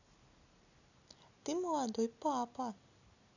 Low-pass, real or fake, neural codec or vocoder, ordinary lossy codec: 7.2 kHz; real; none; none